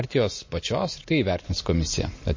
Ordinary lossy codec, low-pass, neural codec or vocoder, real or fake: MP3, 32 kbps; 7.2 kHz; none; real